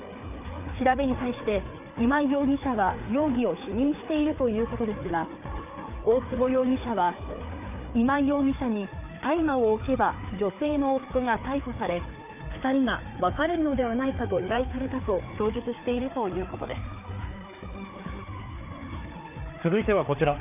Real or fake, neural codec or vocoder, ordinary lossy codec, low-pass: fake; codec, 16 kHz, 4 kbps, FreqCodec, larger model; none; 3.6 kHz